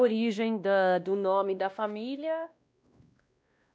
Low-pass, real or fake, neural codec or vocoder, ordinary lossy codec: none; fake; codec, 16 kHz, 1 kbps, X-Codec, WavLM features, trained on Multilingual LibriSpeech; none